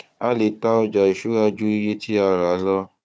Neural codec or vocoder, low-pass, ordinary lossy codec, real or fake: codec, 16 kHz, 4 kbps, FunCodec, trained on Chinese and English, 50 frames a second; none; none; fake